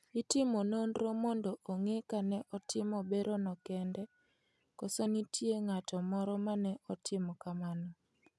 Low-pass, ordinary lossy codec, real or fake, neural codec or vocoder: none; none; real; none